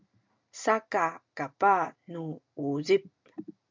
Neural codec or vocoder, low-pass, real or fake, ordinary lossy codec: none; 7.2 kHz; real; MP3, 64 kbps